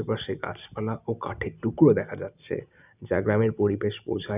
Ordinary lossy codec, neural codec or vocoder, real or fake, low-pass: none; none; real; 3.6 kHz